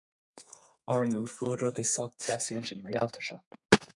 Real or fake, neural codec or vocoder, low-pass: fake; codec, 32 kHz, 1.9 kbps, SNAC; 10.8 kHz